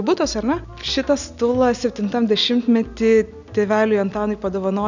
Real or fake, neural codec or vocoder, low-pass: real; none; 7.2 kHz